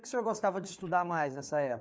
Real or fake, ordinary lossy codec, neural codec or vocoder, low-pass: fake; none; codec, 16 kHz, 4 kbps, FreqCodec, larger model; none